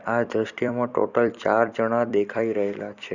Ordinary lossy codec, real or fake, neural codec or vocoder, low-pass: Opus, 64 kbps; fake; vocoder, 44.1 kHz, 128 mel bands every 256 samples, BigVGAN v2; 7.2 kHz